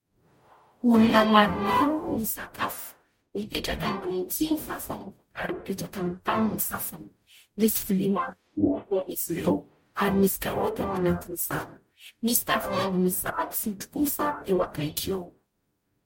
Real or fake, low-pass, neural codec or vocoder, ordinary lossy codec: fake; 19.8 kHz; codec, 44.1 kHz, 0.9 kbps, DAC; MP3, 64 kbps